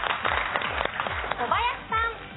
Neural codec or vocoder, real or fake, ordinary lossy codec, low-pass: none; real; AAC, 16 kbps; 7.2 kHz